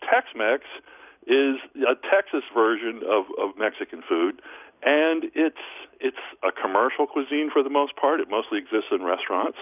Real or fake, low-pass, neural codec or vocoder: real; 3.6 kHz; none